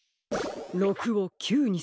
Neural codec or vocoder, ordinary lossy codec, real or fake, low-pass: none; none; real; none